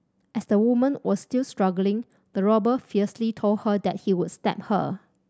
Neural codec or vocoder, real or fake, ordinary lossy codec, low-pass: none; real; none; none